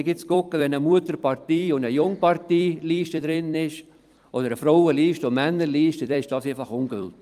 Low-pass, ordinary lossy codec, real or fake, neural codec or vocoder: 14.4 kHz; Opus, 32 kbps; fake; vocoder, 44.1 kHz, 128 mel bands every 256 samples, BigVGAN v2